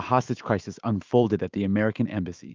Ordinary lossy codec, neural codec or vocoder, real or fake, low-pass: Opus, 24 kbps; autoencoder, 48 kHz, 128 numbers a frame, DAC-VAE, trained on Japanese speech; fake; 7.2 kHz